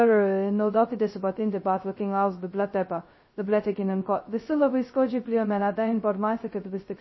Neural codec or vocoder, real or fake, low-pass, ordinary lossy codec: codec, 16 kHz, 0.2 kbps, FocalCodec; fake; 7.2 kHz; MP3, 24 kbps